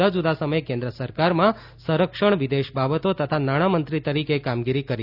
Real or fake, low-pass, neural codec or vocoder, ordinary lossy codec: real; 5.4 kHz; none; none